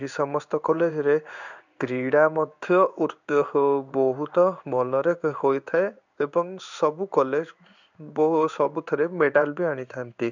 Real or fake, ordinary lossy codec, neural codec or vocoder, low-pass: fake; none; codec, 16 kHz in and 24 kHz out, 1 kbps, XY-Tokenizer; 7.2 kHz